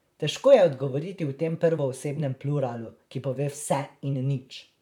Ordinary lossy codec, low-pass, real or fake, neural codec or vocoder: none; 19.8 kHz; fake; vocoder, 44.1 kHz, 128 mel bands, Pupu-Vocoder